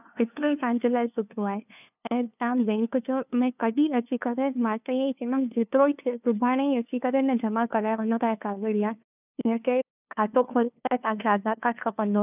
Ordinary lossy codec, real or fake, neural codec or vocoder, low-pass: none; fake; codec, 16 kHz, 2 kbps, FunCodec, trained on LibriTTS, 25 frames a second; 3.6 kHz